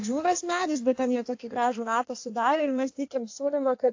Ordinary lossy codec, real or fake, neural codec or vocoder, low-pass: AAC, 48 kbps; fake; codec, 16 kHz in and 24 kHz out, 1.1 kbps, FireRedTTS-2 codec; 7.2 kHz